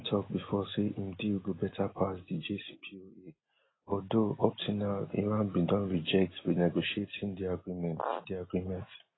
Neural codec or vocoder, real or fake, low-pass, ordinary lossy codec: none; real; 7.2 kHz; AAC, 16 kbps